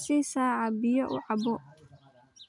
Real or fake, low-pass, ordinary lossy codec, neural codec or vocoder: real; 10.8 kHz; none; none